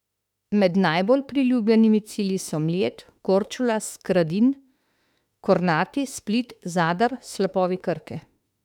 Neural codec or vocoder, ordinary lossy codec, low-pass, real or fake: autoencoder, 48 kHz, 32 numbers a frame, DAC-VAE, trained on Japanese speech; none; 19.8 kHz; fake